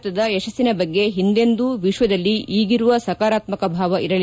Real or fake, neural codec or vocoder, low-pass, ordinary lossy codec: real; none; none; none